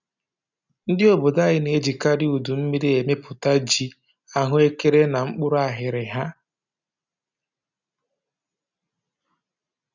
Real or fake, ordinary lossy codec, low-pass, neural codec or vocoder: real; none; 7.2 kHz; none